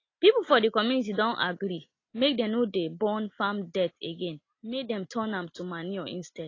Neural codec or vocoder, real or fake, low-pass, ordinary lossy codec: none; real; 7.2 kHz; AAC, 32 kbps